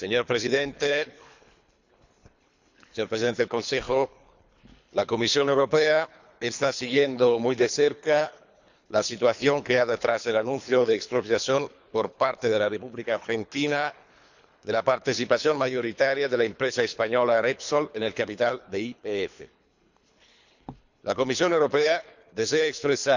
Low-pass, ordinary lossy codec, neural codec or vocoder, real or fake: 7.2 kHz; none; codec, 24 kHz, 3 kbps, HILCodec; fake